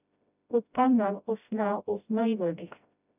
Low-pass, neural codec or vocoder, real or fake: 3.6 kHz; codec, 16 kHz, 0.5 kbps, FreqCodec, smaller model; fake